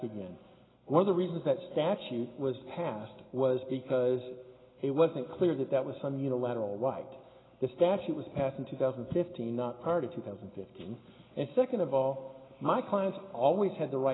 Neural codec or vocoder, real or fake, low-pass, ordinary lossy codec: none; real; 7.2 kHz; AAC, 16 kbps